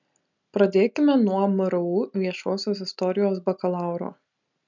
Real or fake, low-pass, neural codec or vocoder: real; 7.2 kHz; none